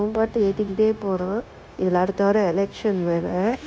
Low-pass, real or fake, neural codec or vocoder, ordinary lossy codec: none; fake; codec, 16 kHz, 0.9 kbps, LongCat-Audio-Codec; none